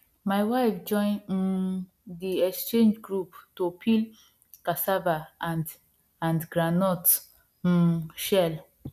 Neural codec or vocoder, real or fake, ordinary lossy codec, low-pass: none; real; none; 14.4 kHz